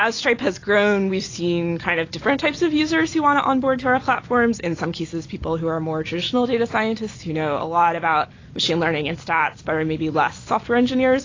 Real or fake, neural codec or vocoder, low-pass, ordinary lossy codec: real; none; 7.2 kHz; AAC, 32 kbps